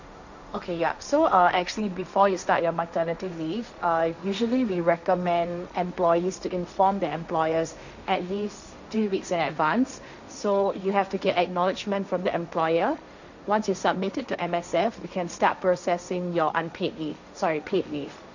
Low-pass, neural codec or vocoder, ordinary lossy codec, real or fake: 7.2 kHz; codec, 16 kHz, 1.1 kbps, Voila-Tokenizer; none; fake